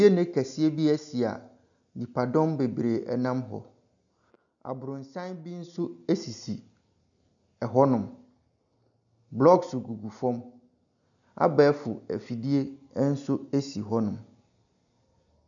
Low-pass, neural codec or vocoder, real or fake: 7.2 kHz; none; real